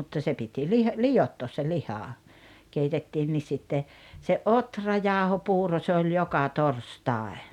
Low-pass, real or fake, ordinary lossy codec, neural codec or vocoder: 19.8 kHz; real; none; none